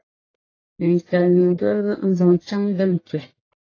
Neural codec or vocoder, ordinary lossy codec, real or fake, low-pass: codec, 44.1 kHz, 1.7 kbps, Pupu-Codec; AAC, 32 kbps; fake; 7.2 kHz